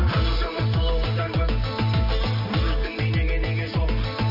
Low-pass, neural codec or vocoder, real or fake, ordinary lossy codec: 5.4 kHz; none; real; AAC, 48 kbps